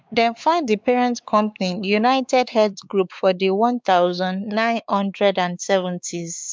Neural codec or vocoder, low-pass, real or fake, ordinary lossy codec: codec, 16 kHz, 4 kbps, X-Codec, WavLM features, trained on Multilingual LibriSpeech; none; fake; none